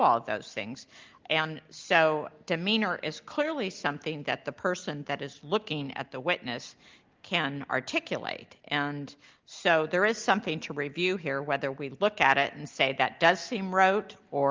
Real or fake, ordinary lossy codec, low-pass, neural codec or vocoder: real; Opus, 32 kbps; 7.2 kHz; none